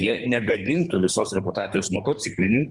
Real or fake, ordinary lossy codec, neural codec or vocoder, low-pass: fake; Opus, 64 kbps; codec, 24 kHz, 3 kbps, HILCodec; 10.8 kHz